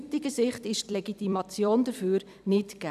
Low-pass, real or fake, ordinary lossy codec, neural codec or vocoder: 14.4 kHz; fake; none; vocoder, 44.1 kHz, 128 mel bands every 256 samples, BigVGAN v2